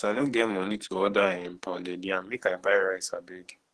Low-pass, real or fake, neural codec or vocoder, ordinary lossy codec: 10.8 kHz; fake; codec, 44.1 kHz, 2.6 kbps, SNAC; Opus, 16 kbps